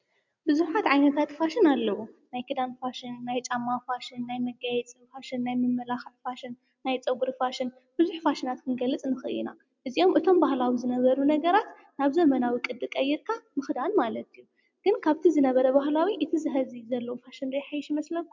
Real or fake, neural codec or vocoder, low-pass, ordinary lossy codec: real; none; 7.2 kHz; MP3, 64 kbps